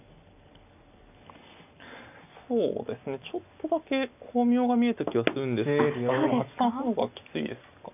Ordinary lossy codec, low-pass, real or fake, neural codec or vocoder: none; 3.6 kHz; real; none